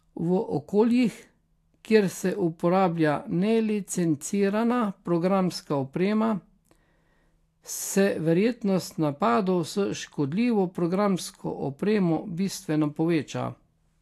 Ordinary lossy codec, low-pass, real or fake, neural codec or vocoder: AAC, 64 kbps; 14.4 kHz; real; none